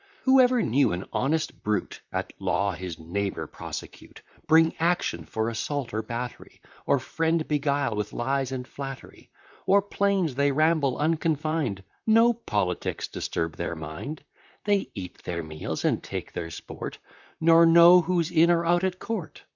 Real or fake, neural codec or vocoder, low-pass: fake; vocoder, 44.1 kHz, 128 mel bands, Pupu-Vocoder; 7.2 kHz